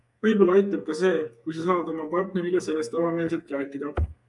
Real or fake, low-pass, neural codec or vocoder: fake; 10.8 kHz; codec, 32 kHz, 1.9 kbps, SNAC